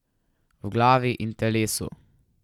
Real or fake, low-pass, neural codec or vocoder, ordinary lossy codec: real; 19.8 kHz; none; none